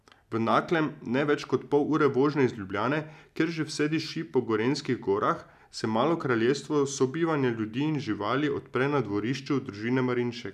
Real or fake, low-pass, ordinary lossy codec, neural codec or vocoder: real; 14.4 kHz; none; none